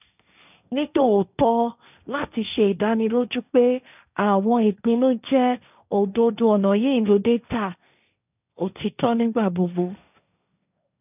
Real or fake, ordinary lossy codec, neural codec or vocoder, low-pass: fake; none; codec, 16 kHz, 1.1 kbps, Voila-Tokenizer; 3.6 kHz